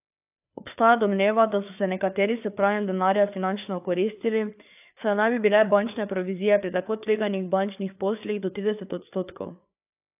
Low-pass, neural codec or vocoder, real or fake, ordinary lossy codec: 3.6 kHz; codec, 16 kHz, 4 kbps, FreqCodec, larger model; fake; AAC, 32 kbps